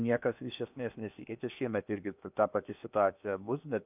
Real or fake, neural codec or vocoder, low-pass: fake; codec, 16 kHz in and 24 kHz out, 0.8 kbps, FocalCodec, streaming, 65536 codes; 3.6 kHz